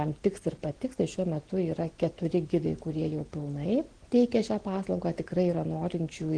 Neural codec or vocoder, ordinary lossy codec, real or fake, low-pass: none; Opus, 16 kbps; real; 9.9 kHz